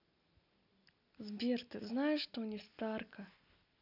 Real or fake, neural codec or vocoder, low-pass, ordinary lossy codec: real; none; 5.4 kHz; none